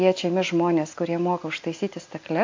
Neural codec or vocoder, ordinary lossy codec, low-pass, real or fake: none; AAC, 48 kbps; 7.2 kHz; real